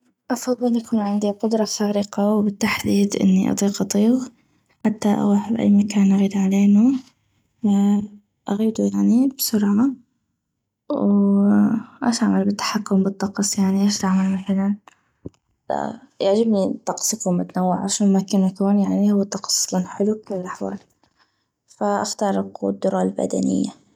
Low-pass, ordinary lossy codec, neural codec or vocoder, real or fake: 19.8 kHz; none; none; real